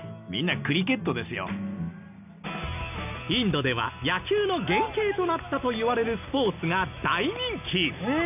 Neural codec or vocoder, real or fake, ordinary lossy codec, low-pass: none; real; none; 3.6 kHz